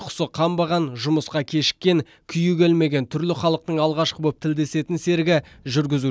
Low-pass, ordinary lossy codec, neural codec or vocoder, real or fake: none; none; none; real